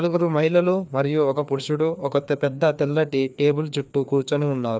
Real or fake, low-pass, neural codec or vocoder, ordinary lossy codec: fake; none; codec, 16 kHz, 2 kbps, FreqCodec, larger model; none